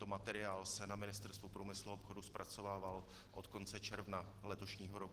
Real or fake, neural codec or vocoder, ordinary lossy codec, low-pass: real; none; Opus, 16 kbps; 14.4 kHz